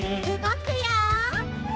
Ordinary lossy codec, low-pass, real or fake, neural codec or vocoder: none; none; fake; codec, 16 kHz, 2 kbps, X-Codec, HuBERT features, trained on general audio